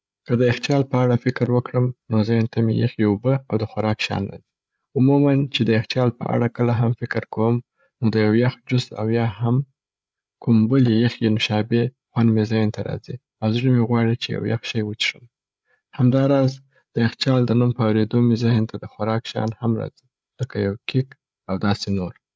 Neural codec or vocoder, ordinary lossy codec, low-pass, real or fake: codec, 16 kHz, 8 kbps, FreqCodec, larger model; none; none; fake